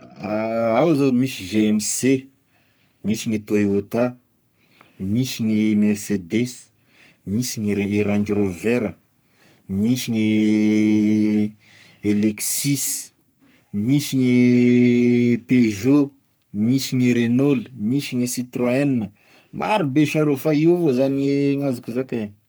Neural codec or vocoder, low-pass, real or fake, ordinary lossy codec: codec, 44.1 kHz, 3.4 kbps, Pupu-Codec; none; fake; none